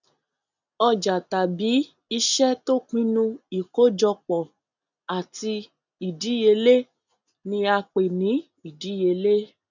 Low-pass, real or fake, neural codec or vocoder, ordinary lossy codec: 7.2 kHz; real; none; none